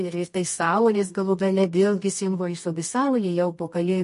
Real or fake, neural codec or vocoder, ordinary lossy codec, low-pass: fake; codec, 24 kHz, 0.9 kbps, WavTokenizer, medium music audio release; MP3, 48 kbps; 10.8 kHz